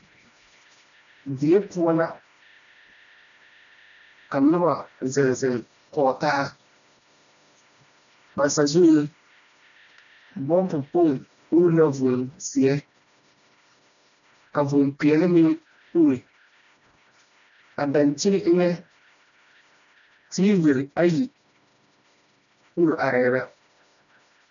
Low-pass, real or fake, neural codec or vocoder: 7.2 kHz; fake; codec, 16 kHz, 1 kbps, FreqCodec, smaller model